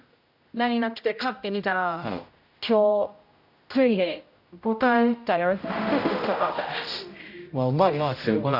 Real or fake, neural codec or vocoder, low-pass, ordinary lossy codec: fake; codec, 16 kHz, 0.5 kbps, X-Codec, HuBERT features, trained on general audio; 5.4 kHz; none